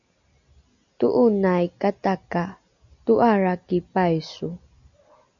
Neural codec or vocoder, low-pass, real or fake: none; 7.2 kHz; real